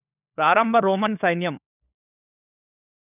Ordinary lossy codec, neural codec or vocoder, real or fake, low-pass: none; codec, 16 kHz, 4 kbps, FunCodec, trained on LibriTTS, 50 frames a second; fake; 3.6 kHz